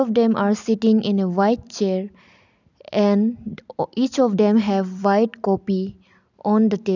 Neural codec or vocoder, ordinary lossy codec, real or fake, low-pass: none; none; real; 7.2 kHz